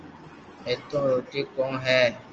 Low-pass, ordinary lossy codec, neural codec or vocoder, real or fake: 7.2 kHz; Opus, 24 kbps; none; real